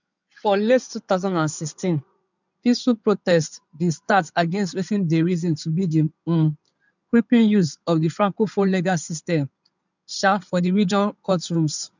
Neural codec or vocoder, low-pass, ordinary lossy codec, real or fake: codec, 16 kHz in and 24 kHz out, 2.2 kbps, FireRedTTS-2 codec; 7.2 kHz; none; fake